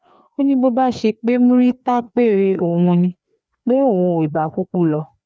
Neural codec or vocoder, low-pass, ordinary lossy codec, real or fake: codec, 16 kHz, 2 kbps, FreqCodec, larger model; none; none; fake